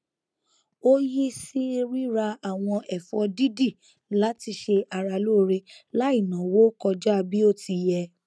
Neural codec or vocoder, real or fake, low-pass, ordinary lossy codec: none; real; none; none